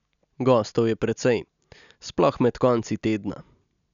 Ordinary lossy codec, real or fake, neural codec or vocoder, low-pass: none; real; none; 7.2 kHz